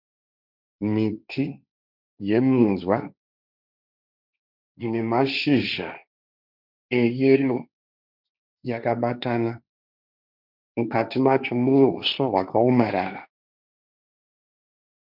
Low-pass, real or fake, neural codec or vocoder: 5.4 kHz; fake; codec, 16 kHz, 1.1 kbps, Voila-Tokenizer